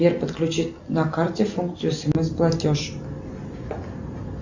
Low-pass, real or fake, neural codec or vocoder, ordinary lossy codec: 7.2 kHz; real; none; Opus, 64 kbps